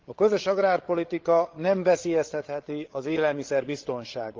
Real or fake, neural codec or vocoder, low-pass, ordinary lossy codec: fake; codec, 16 kHz, 16 kbps, FreqCodec, larger model; 7.2 kHz; Opus, 16 kbps